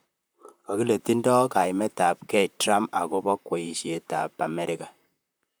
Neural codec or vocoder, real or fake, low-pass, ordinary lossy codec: vocoder, 44.1 kHz, 128 mel bands, Pupu-Vocoder; fake; none; none